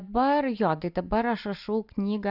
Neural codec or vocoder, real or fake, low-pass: none; real; 5.4 kHz